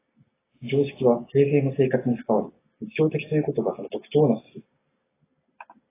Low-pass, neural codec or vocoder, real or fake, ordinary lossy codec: 3.6 kHz; none; real; AAC, 16 kbps